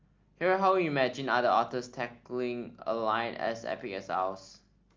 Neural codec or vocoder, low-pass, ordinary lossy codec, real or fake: none; 7.2 kHz; Opus, 32 kbps; real